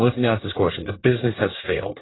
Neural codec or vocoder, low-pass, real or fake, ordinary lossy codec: codec, 24 kHz, 1 kbps, SNAC; 7.2 kHz; fake; AAC, 16 kbps